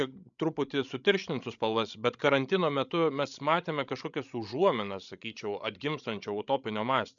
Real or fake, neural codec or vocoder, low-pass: fake; codec, 16 kHz, 16 kbps, FreqCodec, larger model; 7.2 kHz